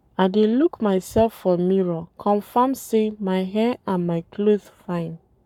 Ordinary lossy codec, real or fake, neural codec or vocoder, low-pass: none; fake; codec, 44.1 kHz, 7.8 kbps, Pupu-Codec; 19.8 kHz